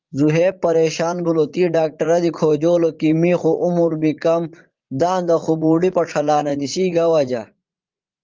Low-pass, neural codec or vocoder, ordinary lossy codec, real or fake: 7.2 kHz; vocoder, 44.1 kHz, 80 mel bands, Vocos; Opus, 32 kbps; fake